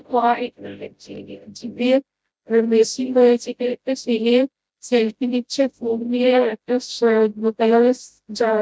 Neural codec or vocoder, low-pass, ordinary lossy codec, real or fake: codec, 16 kHz, 0.5 kbps, FreqCodec, smaller model; none; none; fake